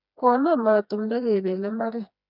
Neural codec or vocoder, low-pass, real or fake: codec, 16 kHz, 2 kbps, FreqCodec, smaller model; 5.4 kHz; fake